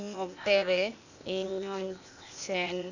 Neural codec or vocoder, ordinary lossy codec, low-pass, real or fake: codec, 16 kHz, 0.8 kbps, ZipCodec; none; 7.2 kHz; fake